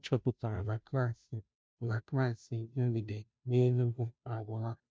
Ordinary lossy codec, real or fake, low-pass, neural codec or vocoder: none; fake; none; codec, 16 kHz, 0.5 kbps, FunCodec, trained on Chinese and English, 25 frames a second